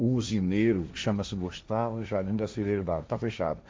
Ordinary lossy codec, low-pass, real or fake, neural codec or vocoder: none; none; fake; codec, 16 kHz, 1.1 kbps, Voila-Tokenizer